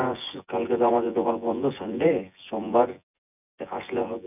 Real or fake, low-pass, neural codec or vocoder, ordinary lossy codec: fake; 3.6 kHz; vocoder, 24 kHz, 100 mel bands, Vocos; none